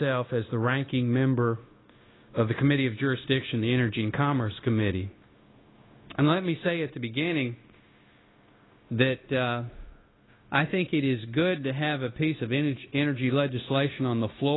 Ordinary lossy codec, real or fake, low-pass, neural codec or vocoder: AAC, 16 kbps; fake; 7.2 kHz; codec, 16 kHz, 0.9 kbps, LongCat-Audio-Codec